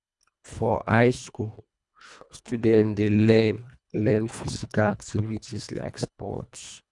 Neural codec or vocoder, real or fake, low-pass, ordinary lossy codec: codec, 24 kHz, 1.5 kbps, HILCodec; fake; 10.8 kHz; none